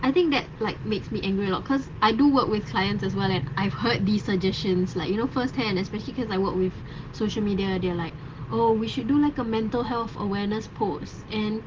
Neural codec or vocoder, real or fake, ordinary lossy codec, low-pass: none; real; Opus, 16 kbps; 7.2 kHz